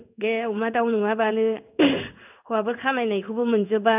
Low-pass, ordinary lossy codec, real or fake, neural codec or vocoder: 3.6 kHz; none; fake; codec, 16 kHz in and 24 kHz out, 1 kbps, XY-Tokenizer